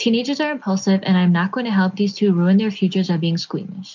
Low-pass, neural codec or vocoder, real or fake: 7.2 kHz; none; real